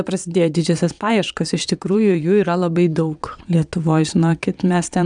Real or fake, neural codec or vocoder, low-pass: fake; vocoder, 22.05 kHz, 80 mel bands, Vocos; 9.9 kHz